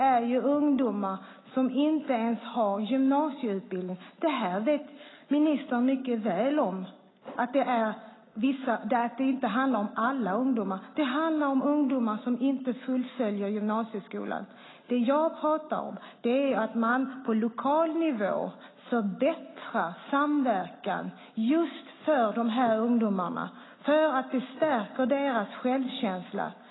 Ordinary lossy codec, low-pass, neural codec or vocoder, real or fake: AAC, 16 kbps; 7.2 kHz; none; real